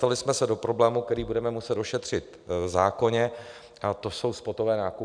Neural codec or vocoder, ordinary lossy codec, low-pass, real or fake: none; MP3, 96 kbps; 9.9 kHz; real